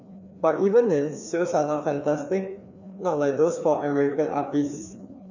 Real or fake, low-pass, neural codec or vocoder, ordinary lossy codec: fake; 7.2 kHz; codec, 16 kHz, 2 kbps, FreqCodec, larger model; none